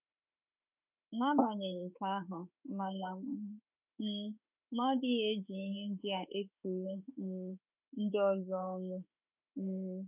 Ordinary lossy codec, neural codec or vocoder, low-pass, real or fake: none; autoencoder, 48 kHz, 32 numbers a frame, DAC-VAE, trained on Japanese speech; 3.6 kHz; fake